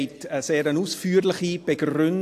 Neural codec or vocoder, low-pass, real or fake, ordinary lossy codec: none; 14.4 kHz; real; AAC, 64 kbps